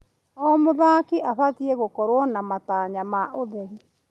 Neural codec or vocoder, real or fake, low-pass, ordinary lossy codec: none; real; 14.4 kHz; Opus, 32 kbps